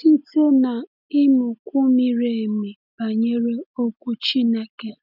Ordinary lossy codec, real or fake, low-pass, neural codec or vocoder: none; real; 5.4 kHz; none